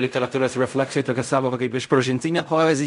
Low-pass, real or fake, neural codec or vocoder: 10.8 kHz; fake; codec, 16 kHz in and 24 kHz out, 0.4 kbps, LongCat-Audio-Codec, fine tuned four codebook decoder